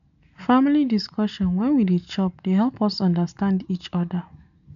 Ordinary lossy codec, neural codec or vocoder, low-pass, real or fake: none; none; 7.2 kHz; real